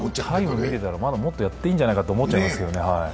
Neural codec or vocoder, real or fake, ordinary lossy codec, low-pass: none; real; none; none